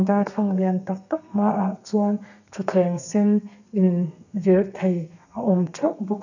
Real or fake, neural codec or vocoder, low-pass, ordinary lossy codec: fake; codec, 32 kHz, 1.9 kbps, SNAC; 7.2 kHz; none